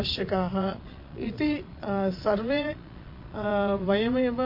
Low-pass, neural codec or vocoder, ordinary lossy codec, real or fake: 5.4 kHz; vocoder, 22.05 kHz, 80 mel bands, Vocos; MP3, 32 kbps; fake